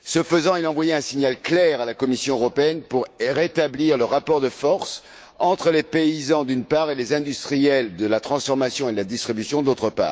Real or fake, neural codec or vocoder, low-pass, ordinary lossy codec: fake; codec, 16 kHz, 6 kbps, DAC; none; none